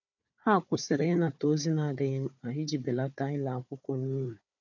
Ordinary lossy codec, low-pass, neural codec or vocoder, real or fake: none; 7.2 kHz; codec, 16 kHz, 4 kbps, FunCodec, trained on Chinese and English, 50 frames a second; fake